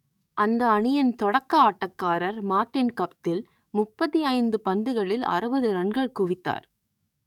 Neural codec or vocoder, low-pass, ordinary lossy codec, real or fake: codec, 44.1 kHz, 7.8 kbps, DAC; 19.8 kHz; none; fake